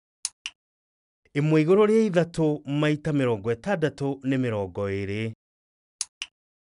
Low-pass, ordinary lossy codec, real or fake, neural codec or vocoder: 10.8 kHz; none; real; none